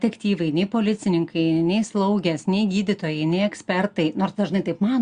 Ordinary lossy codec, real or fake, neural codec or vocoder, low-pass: Opus, 64 kbps; real; none; 9.9 kHz